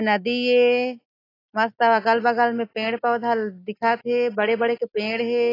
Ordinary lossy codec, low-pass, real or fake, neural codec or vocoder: AAC, 24 kbps; 5.4 kHz; real; none